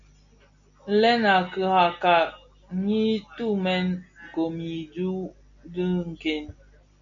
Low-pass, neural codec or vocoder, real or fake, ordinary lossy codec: 7.2 kHz; none; real; MP3, 64 kbps